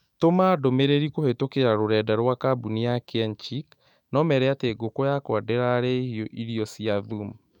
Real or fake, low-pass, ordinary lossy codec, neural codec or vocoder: fake; 19.8 kHz; MP3, 96 kbps; autoencoder, 48 kHz, 128 numbers a frame, DAC-VAE, trained on Japanese speech